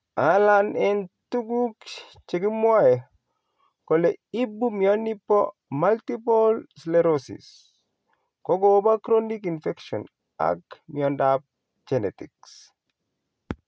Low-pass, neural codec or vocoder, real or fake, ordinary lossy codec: none; none; real; none